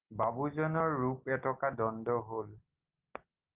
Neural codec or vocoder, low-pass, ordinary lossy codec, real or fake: none; 3.6 kHz; Opus, 32 kbps; real